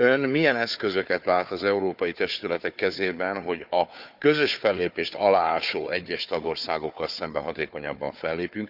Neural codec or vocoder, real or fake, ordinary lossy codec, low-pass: codec, 16 kHz, 4 kbps, FunCodec, trained on Chinese and English, 50 frames a second; fake; none; 5.4 kHz